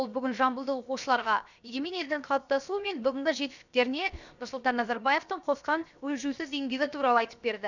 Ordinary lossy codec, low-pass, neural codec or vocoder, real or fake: none; 7.2 kHz; codec, 16 kHz, 0.7 kbps, FocalCodec; fake